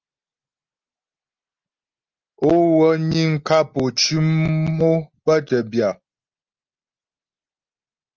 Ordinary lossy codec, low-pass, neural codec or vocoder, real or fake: Opus, 32 kbps; 7.2 kHz; none; real